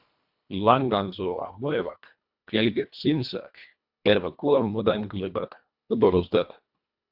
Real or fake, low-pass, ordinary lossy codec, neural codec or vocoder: fake; 5.4 kHz; AAC, 48 kbps; codec, 24 kHz, 1.5 kbps, HILCodec